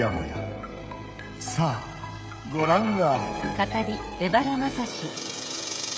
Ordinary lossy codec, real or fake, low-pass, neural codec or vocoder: none; fake; none; codec, 16 kHz, 16 kbps, FreqCodec, smaller model